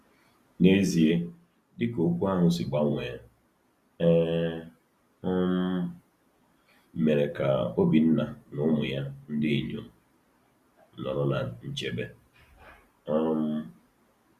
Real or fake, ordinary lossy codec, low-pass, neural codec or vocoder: real; Opus, 64 kbps; 14.4 kHz; none